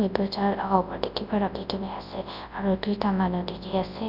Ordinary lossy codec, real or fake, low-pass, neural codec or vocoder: none; fake; 5.4 kHz; codec, 24 kHz, 0.9 kbps, WavTokenizer, large speech release